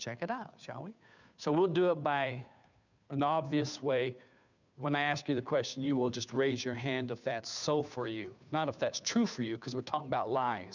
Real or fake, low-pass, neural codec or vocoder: fake; 7.2 kHz; codec, 16 kHz, 2 kbps, FunCodec, trained on Chinese and English, 25 frames a second